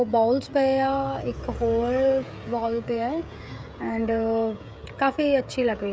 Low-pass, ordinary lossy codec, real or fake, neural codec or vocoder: none; none; fake; codec, 16 kHz, 16 kbps, FreqCodec, smaller model